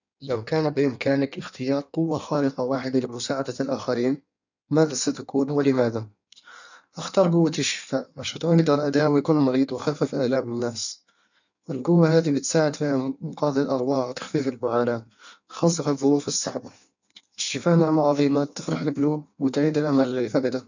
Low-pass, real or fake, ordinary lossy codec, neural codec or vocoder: 7.2 kHz; fake; none; codec, 16 kHz in and 24 kHz out, 1.1 kbps, FireRedTTS-2 codec